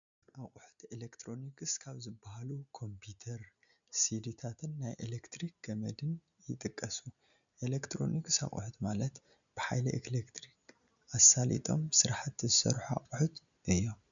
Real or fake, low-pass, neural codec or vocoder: real; 7.2 kHz; none